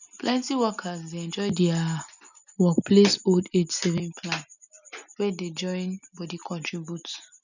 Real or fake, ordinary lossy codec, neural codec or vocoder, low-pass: real; none; none; 7.2 kHz